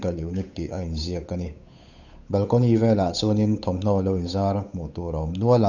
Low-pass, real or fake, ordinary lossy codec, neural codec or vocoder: 7.2 kHz; fake; none; codec, 16 kHz, 8 kbps, FunCodec, trained on Chinese and English, 25 frames a second